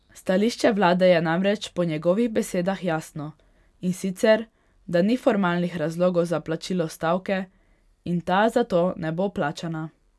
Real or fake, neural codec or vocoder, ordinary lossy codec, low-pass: real; none; none; none